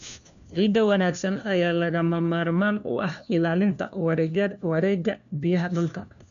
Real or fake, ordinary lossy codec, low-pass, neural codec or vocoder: fake; none; 7.2 kHz; codec, 16 kHz, 1 kbps, FunCodec, trained on LibriTTS, 50 frames a second